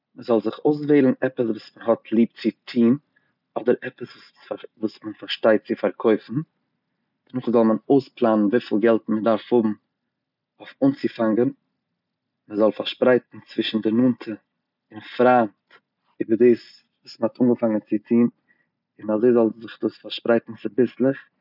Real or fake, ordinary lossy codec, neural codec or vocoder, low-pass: real; none; none; 5.4 kHz